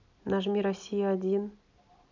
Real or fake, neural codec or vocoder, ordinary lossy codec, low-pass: real; none; none; 7.2 kHz